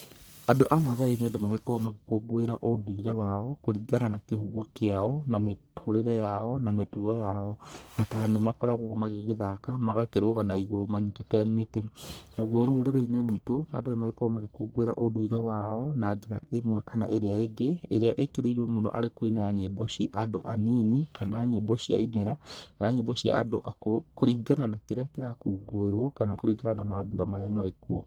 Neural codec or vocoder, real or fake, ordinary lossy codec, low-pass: codec, 44.1 kHz, 1.7 kbps, Pupu-Codec; fake; none; none